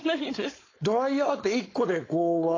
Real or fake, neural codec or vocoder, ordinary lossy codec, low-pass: fake; codec, 16 kHz, 4.8 kbps, FACodec; AAC, 32 kbps; 7.2 kHz